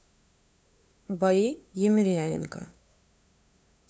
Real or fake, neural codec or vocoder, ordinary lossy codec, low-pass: fake; codec, 16 kHz, 2 kbps, FunCodec, trained on LibriTTS, 25 frames a second; none; none